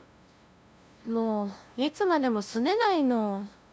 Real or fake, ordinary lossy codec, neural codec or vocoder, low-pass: fake; none; codec, 16 kHz, 0.5 kbps, FunCodec, trained on LibriTTS, 25 frames a second; none